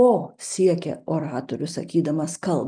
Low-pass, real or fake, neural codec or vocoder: 9.9 kHz; real; none